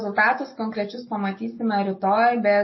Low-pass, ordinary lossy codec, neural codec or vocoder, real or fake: 7.2 kHz; MP3, 24 kbps; none; real